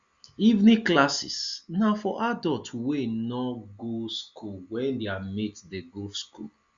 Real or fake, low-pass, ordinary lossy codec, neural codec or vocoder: real; 7.2 kHz; Opus, 64 kbps; none